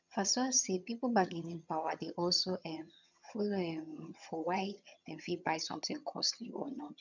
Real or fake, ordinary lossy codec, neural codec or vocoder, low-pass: fake; none; vocoder, 22.05 kHz, 80 mel bands, HiFi-GAN; 7.2 kHz